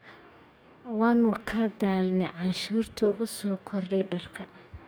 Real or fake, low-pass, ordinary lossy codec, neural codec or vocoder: fake; none; none; codec, 44.1 kHz, 2.6 kbps, DAC